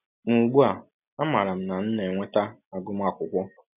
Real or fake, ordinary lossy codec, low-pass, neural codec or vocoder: real; none; 3.6 kHz; none